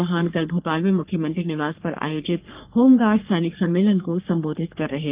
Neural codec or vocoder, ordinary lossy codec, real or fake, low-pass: codec, 44.1 kHz, 3.4 kbps, Pupu-Codec; Opus, 32 kbps; fake; 3.6 kHz